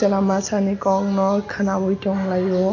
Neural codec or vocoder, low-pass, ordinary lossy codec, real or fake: none; 7.2 kHz; none; real